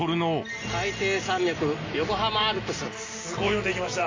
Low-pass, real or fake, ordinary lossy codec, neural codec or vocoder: 7.2 kHz; real; AAC, 32 kbps; none